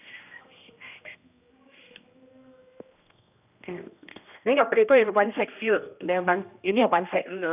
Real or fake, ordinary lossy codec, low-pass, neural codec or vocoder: fake; none; 3.6 kHz; codec, 16 kHz, 1 kbps, X-Codec, HuBERT features, trained on general audio